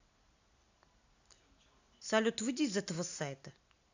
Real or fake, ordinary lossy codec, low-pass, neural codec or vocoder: real; AAC, 48 kbps; 7.2 kHz; none